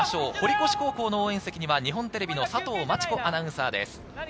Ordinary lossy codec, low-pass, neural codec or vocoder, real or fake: none; none; none; real